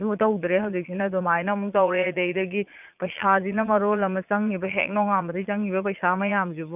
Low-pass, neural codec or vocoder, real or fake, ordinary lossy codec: 3.6 kHz; vocoder, 22.05 kHz, 80 mel bands, Vocos; fake; none